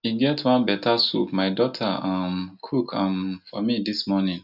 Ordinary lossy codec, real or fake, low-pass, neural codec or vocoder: none; real; 5.4 kHz; none